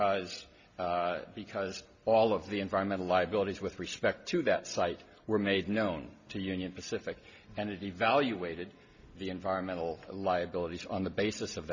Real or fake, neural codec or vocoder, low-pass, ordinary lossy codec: real; none; 7.2 kHz; MP3, 64 kbps